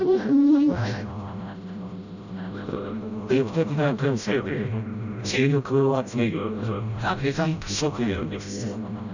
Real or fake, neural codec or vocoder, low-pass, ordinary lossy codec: fake; codec, 16 kHz, 0.5 kbps, FreqCodec, smaller model; 7.2 kHz; none